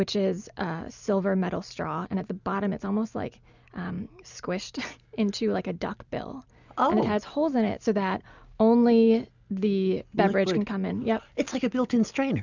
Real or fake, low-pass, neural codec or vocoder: real; 7.2 kHz; none